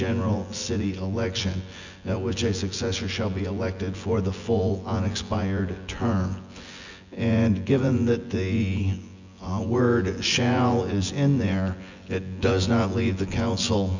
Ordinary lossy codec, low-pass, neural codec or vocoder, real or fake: Opus, 64 kbps; 7.2 kHz; vocoder, 24 kHz, 100 mel bands, Vocos; fake